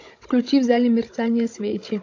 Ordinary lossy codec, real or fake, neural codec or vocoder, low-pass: AAC, 48 kbps; fake; codec, 16 kHz, 16 kbps, FunCodec, trained on Chinese and English, 50 frames a second; 7.2 kHz